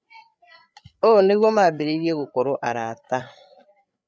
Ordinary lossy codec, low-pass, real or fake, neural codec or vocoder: none; none; fake; codec, 16 kHz, 8 kbps, FreqCodec, larger model